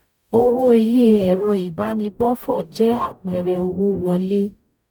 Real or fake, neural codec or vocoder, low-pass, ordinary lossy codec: fake; codec, 44.1 kHz, 0.9 kbps, DAC; 19.8 kHz; none